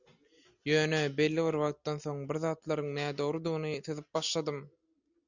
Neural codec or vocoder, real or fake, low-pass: none; real; 7.2 kHz